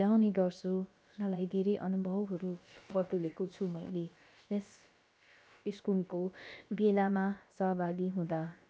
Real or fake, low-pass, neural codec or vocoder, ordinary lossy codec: fake; none; codec, 16 kHz, about 1 kbps, DyCAST, with the encoder's durations; none